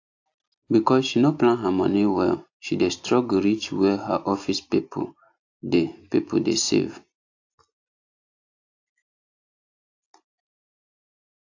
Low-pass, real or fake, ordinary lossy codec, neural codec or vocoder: 7.2 kHz; real; AAC, 48 kbps; none